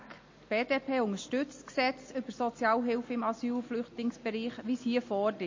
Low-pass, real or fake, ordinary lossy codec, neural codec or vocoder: 7.2 kHz; real; MP3, 32 kbps; none